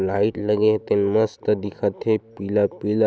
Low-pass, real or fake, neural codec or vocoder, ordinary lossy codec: none; real; none; none